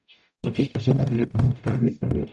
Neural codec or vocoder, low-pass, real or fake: codec, 44.1 kHz, 0.9 kbps, DAC; 10.8 kHz; fake